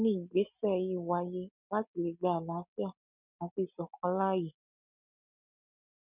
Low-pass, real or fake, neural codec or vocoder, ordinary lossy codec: 3.6 kHz; real; none; none